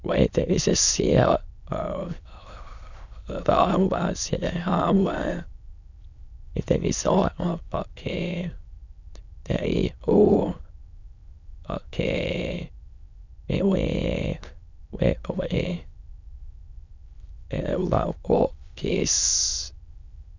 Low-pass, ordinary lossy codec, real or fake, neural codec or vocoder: 7.2 kHz; none; fake; autoencoder, 22.05 kHz, a latent of 192 numbers a frame, VITS, trained on many speakers